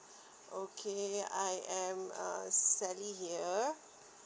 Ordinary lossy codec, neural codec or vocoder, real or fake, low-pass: none; none; real; none